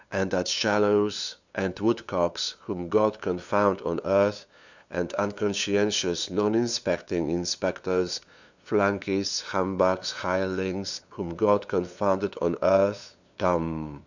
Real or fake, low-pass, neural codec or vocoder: fake; 7.2 kHz; codec, 16 kHz, 2 kbps, FunCodec, trained on LibriTTS, 25 frames a second